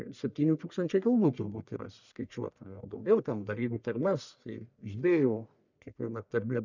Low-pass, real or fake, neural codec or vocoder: 7.2 kHz; fake; codec, 44.1 kHz, 1.7 kbps, Pupu-Codec